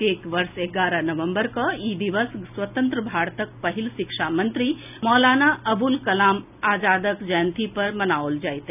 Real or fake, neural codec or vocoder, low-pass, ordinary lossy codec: real; none; 3.6 kHz; none